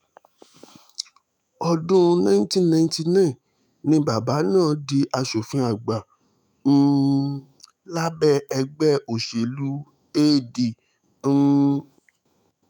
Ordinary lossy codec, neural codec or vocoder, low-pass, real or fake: none; autoencoder, 48 kHz, 128 numbers a frame, DAC-VAE, trained on Japanese speech; 19.8 kHz; fake